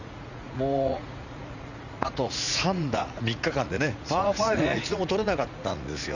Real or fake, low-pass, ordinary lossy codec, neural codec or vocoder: fake; 7.2 kHz; none; vocoder, 44.1 kHz, 80 mel bands, Vocos